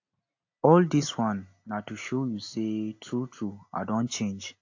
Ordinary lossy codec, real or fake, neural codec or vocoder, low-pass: AAC, 48 kbps; real; none; 7.2 kHz